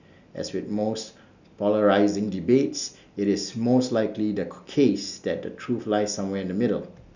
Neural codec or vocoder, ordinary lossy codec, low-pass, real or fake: none; none; 7.2 kHz; real